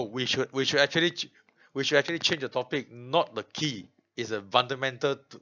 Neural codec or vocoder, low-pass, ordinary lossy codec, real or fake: none; 7.2 kHz; none; real